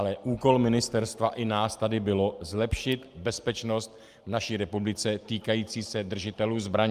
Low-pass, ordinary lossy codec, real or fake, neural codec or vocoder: 14.4 kHz; Opus, 32 kbps; real; none